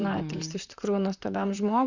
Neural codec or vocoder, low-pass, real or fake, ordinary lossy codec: vocoder, 44.1 kHz, 128 mel bands, Pupu-Vocoder; 7.2 kHz; fake; Opus, 64 kbps